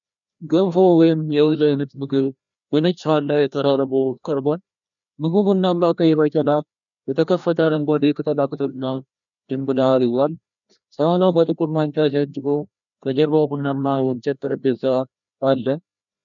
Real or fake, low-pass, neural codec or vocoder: fake; 7.2 kHz; codec, 16 kHz, 1 kbps, FreqCodec, larger model